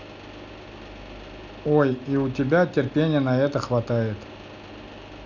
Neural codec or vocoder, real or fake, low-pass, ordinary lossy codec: none; real; 7.2 kHz; none